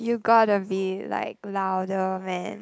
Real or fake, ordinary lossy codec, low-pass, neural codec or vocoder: real; none; none; none